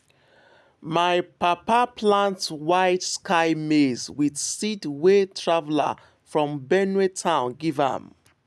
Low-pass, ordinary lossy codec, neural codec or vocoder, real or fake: none; none; none; real